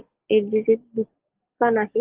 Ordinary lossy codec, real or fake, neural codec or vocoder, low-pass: Opus, 16 kbps; real; none; 3.6 kHz